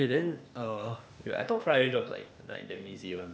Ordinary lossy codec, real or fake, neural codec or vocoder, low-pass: none; fake; codec, 16 kHz, 0.8 kbps, ZipCodec; none